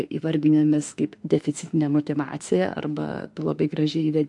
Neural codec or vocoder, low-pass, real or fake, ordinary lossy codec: autoencoder, 48 kHz, 32 numbers a frame, DAC-VAE, trained on Japanese speech; 10.8 kHz; fake; AAC, 64 kbps